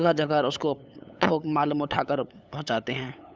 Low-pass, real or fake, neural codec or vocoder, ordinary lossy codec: none; fake; codec, 16 kHz, 8 kbps, FunCodec, trained on LibriTTS, 25 frames a second; none